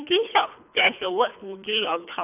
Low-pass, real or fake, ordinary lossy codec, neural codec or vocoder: 3.6 kHz; fake; none; codec, 24 kHz, 3 kbps, HILCodec